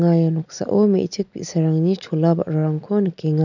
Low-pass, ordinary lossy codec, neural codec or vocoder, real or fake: 7.2 kHz; none; none; real